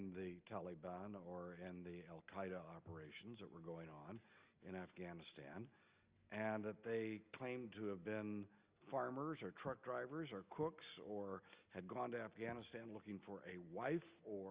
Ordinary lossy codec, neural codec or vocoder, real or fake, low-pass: Opus, 32 kbps; none; real; 3.6 kHz